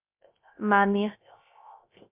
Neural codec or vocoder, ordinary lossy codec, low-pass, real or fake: codec, 16 kHz, 0.3 kbps, FocalCodec; MP3, 32 kbps; 3.6 kHz; fake